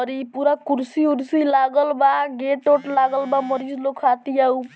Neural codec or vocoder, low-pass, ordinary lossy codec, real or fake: none; none; none; real